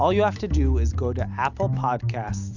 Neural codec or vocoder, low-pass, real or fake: none; 7.2 kHz; real